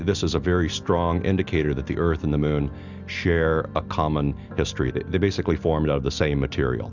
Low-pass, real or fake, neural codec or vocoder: 7.2 kHz; real; none